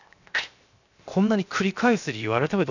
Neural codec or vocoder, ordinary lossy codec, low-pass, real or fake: codec, 16 kHz, 0.7 kbps, FocalCodec; none; 7.2 kHz; fake